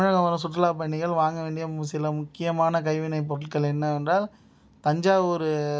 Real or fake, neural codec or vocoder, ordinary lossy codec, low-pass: real; none; none; none